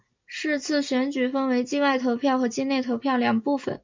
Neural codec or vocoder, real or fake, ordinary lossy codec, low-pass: none; real; MP3, 64 kbps; 7.2 kHz